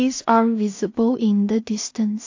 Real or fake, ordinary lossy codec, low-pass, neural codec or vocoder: fake; AAC, 48 kbps; 7.2 kHz; codec, 16 kHz in and 24 kHz out, 0.4 kbps, LongCat-Audio-Codec, two codebook decoder